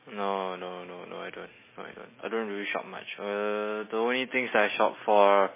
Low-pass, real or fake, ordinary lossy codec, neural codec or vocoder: 3.6 kHz; real; MP3, 16 kbps; none